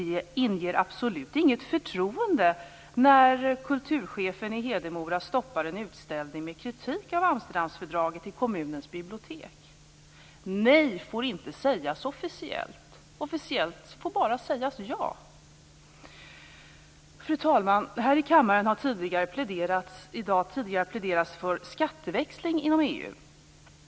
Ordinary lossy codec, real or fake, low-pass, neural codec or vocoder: none; real; none; none